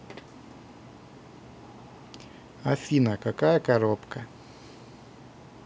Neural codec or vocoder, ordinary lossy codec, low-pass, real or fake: none; none; none; real